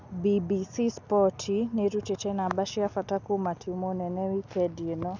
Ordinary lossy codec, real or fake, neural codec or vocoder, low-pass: none; real; none; 7.2 kHz